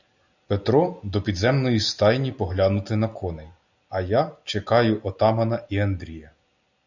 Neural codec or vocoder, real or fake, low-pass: none; real; 7.2 kHz